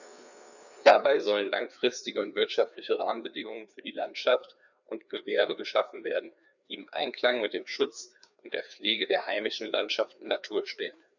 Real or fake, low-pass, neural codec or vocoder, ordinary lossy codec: fake; 7.2 kHz; codec, 16 kHz, 2 kbps, FreqCodec, larger model; none